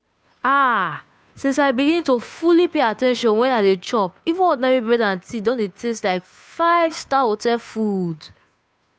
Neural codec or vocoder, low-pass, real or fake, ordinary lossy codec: codec, 16 kHz, 2 kbps, FunCodec, trained on Chinese and English, 25 frames a second; none; fake; none